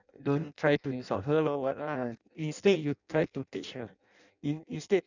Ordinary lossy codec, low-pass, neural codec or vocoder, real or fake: none; 7.2 kHz; codec, 16 kHz in and 24 kHz out, 0.6 kbps, FireRedTTS-2 codec; fake